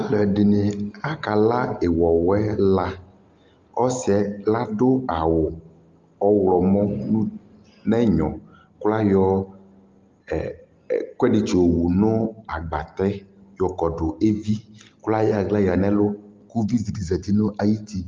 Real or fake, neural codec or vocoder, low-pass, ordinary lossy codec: real; none; 7.2 kHz; Opus, 32 kbps